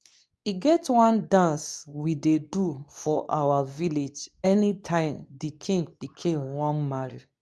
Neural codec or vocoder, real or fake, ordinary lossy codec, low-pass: codec, 24 kHz, 0.9 kbps, WavTokenizer, medium speech release version 2; fake; none; none